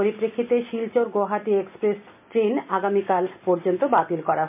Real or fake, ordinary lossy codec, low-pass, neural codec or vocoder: real; MP3, 32 kbps; 3.6 kHz; none